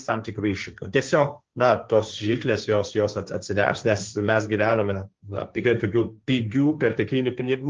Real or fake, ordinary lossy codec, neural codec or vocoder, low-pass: fake; Opus, 24 kbps; codec, 16 kHz, 1.1 kbps, Voila-Tokenizer; 7.2 kHz